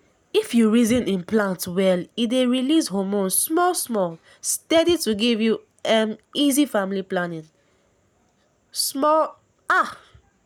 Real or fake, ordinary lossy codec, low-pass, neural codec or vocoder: real; none; 19.8 kHz; none